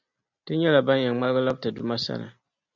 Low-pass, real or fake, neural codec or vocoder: 7.2 kHz; real; none